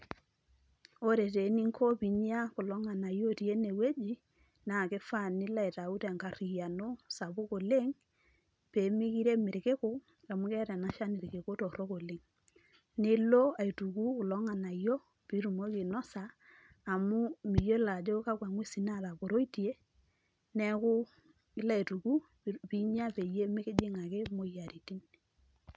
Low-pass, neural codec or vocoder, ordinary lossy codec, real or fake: none; none; none; real